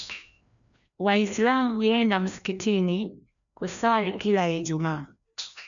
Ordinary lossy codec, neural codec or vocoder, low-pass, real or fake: none; codec, 16 kHz, 1 kbps, FreqCodec, larger model; 7.2 kHz; fake